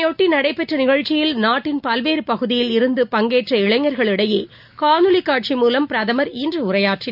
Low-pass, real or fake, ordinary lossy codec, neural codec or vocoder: 5.4 kHz; real; none; none